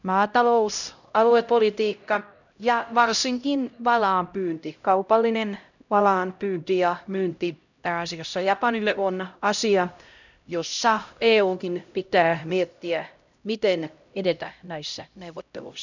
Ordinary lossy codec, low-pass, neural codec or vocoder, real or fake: none; 7.2 kHz; codec, 16 kHz, 0.5 kbps, X-Codec, HuBERT features, trained on LibriSpeech; fake